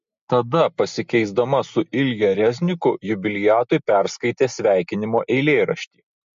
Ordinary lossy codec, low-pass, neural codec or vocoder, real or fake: MP3, 48 kbps; 7.2 kHz; none; real